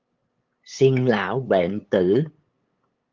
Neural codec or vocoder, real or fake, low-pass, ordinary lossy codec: codec, 16 kHz, 8 kbps, FunCodec, trained on LibriTTS, 25 frames a second; fake; 7.2 kHz; Opus, 32 kbps